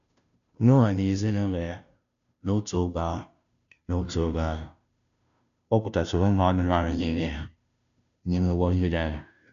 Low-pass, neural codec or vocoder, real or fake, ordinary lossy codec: 7.2 kHz; codec, 16 kHz, 0.5 kbps, FunCodec, trained on Chinese and English, 25 frames a second; fake; none